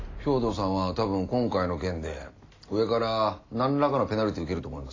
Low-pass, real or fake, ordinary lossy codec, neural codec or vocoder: 7.2 kHz; real; AAC, 32 kbps; none